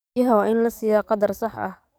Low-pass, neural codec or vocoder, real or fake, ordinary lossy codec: none; codec, 44.1 kHz, 7.8 kbps, DAC; fake; none